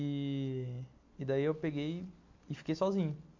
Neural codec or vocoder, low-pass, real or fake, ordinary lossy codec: none; 7.2 kHz; real; MP3, 48 kbps